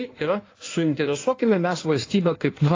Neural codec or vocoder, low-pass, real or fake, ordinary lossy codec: codec, 16 kHz in and 24 kHz out, 1.1 kbps, FireRedTTS-2 codec; 7.2 kHz; fake; AAC, 32 kbps